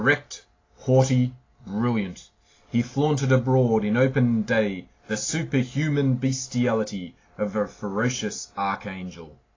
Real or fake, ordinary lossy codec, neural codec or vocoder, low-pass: real; AAC, 32 kbps; none; 7.2 kHz